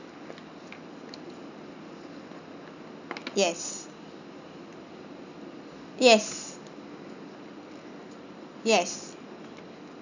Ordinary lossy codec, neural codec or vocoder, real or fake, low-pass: none; none; real; 7.2 kHz